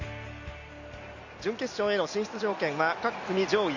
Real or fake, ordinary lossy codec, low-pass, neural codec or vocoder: real; Opus, 64 kbps; 7.2 kHz; none